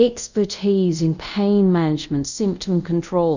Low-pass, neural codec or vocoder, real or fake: 7.2 kHz; codec, 24 kHz, 0.5 kbps, DualCodec; fake